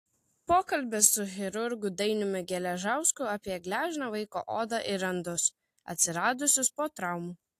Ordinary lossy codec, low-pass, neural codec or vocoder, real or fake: AAC, 64 kbps; 14.4 kHz; none; real